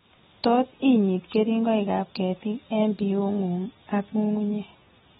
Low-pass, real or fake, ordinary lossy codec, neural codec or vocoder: 19.8 kHz; real; AAC, 16 kbps; none